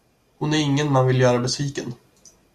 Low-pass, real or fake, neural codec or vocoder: 14.4 kHz; real; none